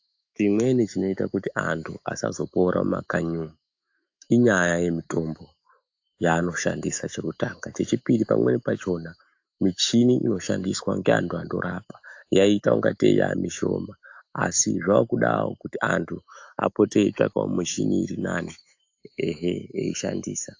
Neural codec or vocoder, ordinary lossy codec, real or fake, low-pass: autoencoder, 48 kHz, 128 numbers a frame, DAC-VAE, trained on Japanese speech; AAC, 48 kbps; fake; 7.2 kHz